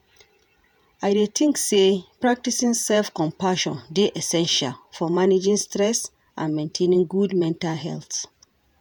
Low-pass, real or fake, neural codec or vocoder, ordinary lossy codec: none; fake; vocoder, 48 kHz, 128 mel bands, Vocos; none